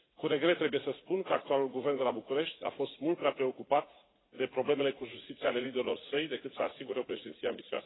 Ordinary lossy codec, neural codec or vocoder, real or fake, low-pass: AAC, 16 kbps; vocoder, 44.1 kHz, 80 mel bands, Vocos; fake; 7.2 kHz